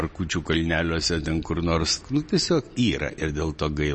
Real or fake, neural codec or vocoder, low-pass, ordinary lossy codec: real; none; 10.8 kHz; MP3, 32 kbps